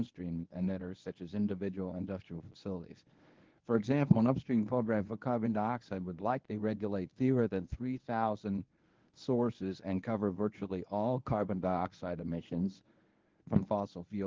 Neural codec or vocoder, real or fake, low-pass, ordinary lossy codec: codec, 24 kHz, 0.9 kbps, WavTokenizer, medium speech release version 1; fake; 7.2 kHz; Opus, 16 kbps